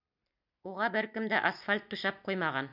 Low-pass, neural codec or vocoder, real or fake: 5.4 kHz; none; real